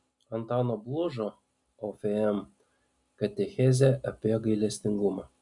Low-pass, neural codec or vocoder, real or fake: 10.8 kHz; none; real